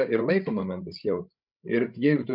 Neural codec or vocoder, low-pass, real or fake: codec, 16 kHz, 16 kbps, FreqCodec, larger model; 5.4 kHz; fake